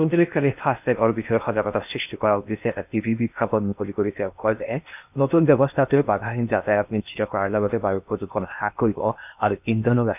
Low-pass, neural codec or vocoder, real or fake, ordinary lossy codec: 3.6 kHz; codec, 16 kHz in and 24 kHz out, 0.8 kbps, FocalCodec, streaming, 65536 codes; fake; none